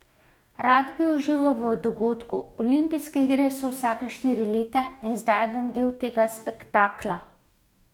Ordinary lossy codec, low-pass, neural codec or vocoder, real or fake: none; 19.8 kHz; codec, 44.1 kHz, 2.6 kbps, DAC; fake